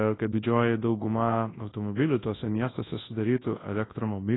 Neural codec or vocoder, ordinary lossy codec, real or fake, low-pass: codec, 24 kHz, 0.9 kbps, WavTokenizer, large speech release; AAC, 16 kbps; fake; 7.2 kHz